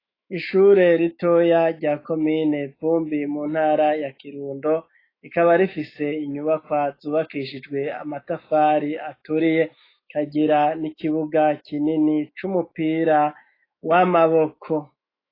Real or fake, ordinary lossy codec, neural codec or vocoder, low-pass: fake; AAC, 24 kbps; autoencoder, 48 kHz, 128 numbers a frame, DAC-VAE, trained on Japanese speech; 5.4 kHz